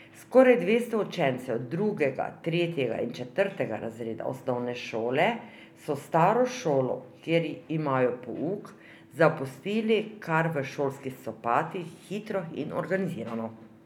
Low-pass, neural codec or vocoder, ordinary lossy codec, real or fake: 19.8 kHz; none; none; real